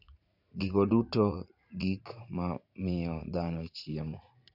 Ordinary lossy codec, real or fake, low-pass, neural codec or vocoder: none; fake; 5.4 kHz; vocoder, 44.1 kHz, 80 mel bands, Vocos